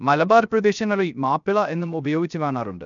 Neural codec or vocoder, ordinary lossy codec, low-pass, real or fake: codec, 16 kHz, 0.3 kbps, FocalCodec; none; 7.2 kHz; fake